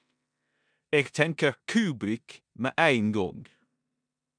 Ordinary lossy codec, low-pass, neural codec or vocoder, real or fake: MP3, 96 kbps; 9.9 kHz; codec, 16 kHz in and 24 kHz out, 0.9 kbps, LongCat-Audio-Codec, four codebook decoder; fake